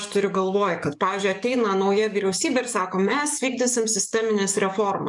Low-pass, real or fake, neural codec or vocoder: 10.8 kHz; fake; codec, 44.1 kHz, 7.8 kbps, DAC